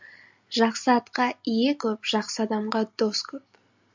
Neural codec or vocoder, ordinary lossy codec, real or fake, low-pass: none; MP3, 64 kbps; real; 7.2 kHz